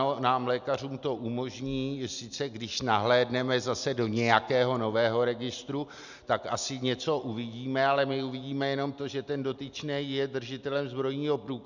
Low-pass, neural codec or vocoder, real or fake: 7.2 kHz; none; real